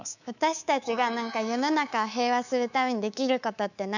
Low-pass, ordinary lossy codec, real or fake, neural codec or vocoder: 7.2 kHz; none; fake; codec, 24 kHz, 3.1 kbps, DualCodec